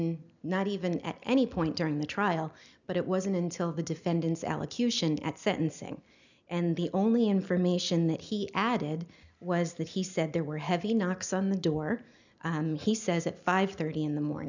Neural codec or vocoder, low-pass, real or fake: none; 7.2 kHz; real